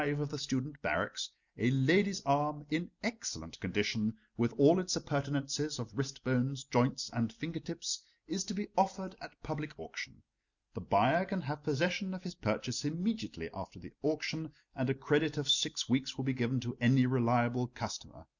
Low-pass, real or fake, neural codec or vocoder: 7.2 kHz; real; none